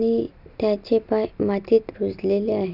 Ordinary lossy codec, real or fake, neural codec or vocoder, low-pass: none; real; none; 5.4 kHz